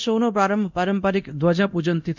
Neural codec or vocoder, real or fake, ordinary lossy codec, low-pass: codec, 24 kHz, 0.9 kbps, DualCodec; fake; none; 7.2 kHz